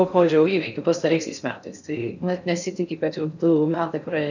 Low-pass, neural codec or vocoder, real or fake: 7.2 kHz; codec, 16 kHz in and 24 kHz out, 0.6 kbps, FocalCodec, streaming, 4096 codes; fake